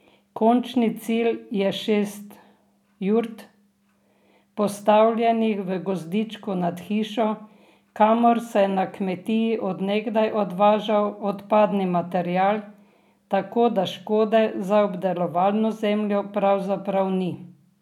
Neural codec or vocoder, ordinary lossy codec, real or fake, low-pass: none; none; real; 19.8 kHz